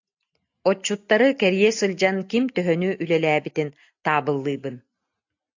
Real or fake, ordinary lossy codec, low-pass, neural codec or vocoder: real; AAC, 48 kbps; 7.2 kHz; none